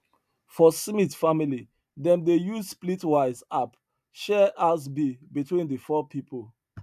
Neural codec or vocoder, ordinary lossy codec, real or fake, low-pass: none; none; real; 14.4 kHz